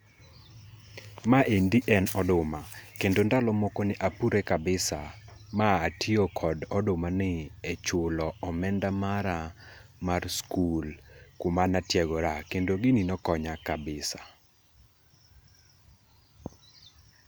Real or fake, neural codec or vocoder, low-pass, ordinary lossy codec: real; none; none; none